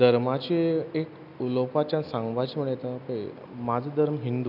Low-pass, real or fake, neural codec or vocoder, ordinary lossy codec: 5.4 kHz; real; none; none